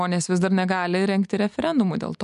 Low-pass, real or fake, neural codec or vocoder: 10.8 kHz; real; none